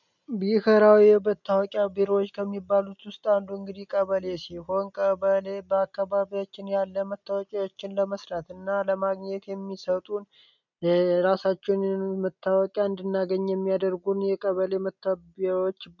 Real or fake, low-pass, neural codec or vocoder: real; 7.2 kHz; none